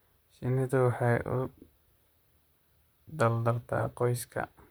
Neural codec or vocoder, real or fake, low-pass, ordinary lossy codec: vocoder, 44.1 kHz, 128 mel bands, Pupu-Vocoder; fake; none; none